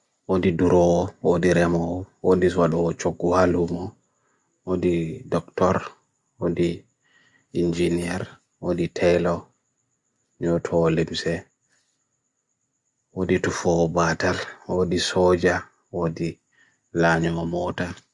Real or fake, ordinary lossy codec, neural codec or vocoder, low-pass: real; Opus, 64 kbps; none; 10.8 kHz